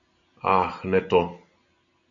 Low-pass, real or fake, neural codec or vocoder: 7.2 kHz; real; none